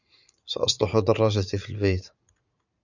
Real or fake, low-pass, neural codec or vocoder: fake; 7.2 kHz; vocoder, 44.1 kHz, 80 mel bands, Vocos